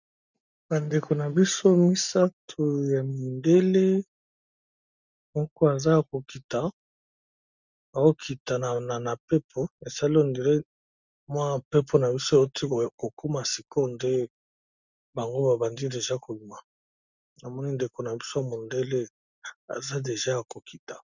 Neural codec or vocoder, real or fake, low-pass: none; real; 7.2 kHz